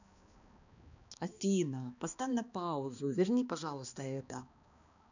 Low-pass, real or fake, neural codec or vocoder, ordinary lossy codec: 7.2 kHz; fake; codec, 16 kHz, 2 kbps, X-Codec, HuBERT features, trained on balanced general audio; none